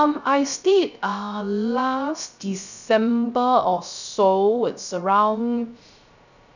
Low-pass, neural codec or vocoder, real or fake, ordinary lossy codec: 7.2 kHz; codec, 16 kHz, 0.3 kbps, FocalCodec; fake; none